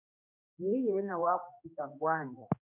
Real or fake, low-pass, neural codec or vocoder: fake; 3.6 kHz; codec, 16 kHz, 1 kbps, X-Codec, HuBERT features, trained on general audio